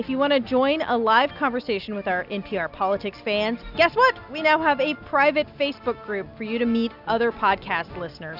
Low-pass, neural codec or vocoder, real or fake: 5.4 kHz; none; real